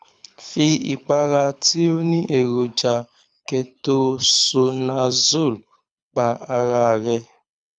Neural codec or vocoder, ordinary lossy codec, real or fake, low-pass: codec, 24 kHz, 6 kbps, HILCodec; none; fake; 9.9 kHz